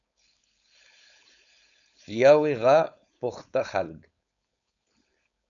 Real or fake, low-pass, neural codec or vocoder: fake; 7.2 kHz; codec, 16 kHz, 4.8 kbps, FACodec